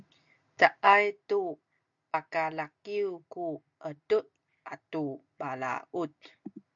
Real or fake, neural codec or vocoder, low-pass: real; none; 7.2 kHz